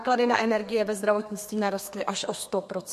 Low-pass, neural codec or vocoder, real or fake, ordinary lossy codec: 14.4 kHz; codec, 32 kHz, 1.9 kbps, SNAC; fake; MP3, 64 kbps